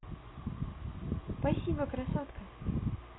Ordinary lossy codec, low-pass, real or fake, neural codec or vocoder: AAC, 16 kbps; 7.2 kHz; real; none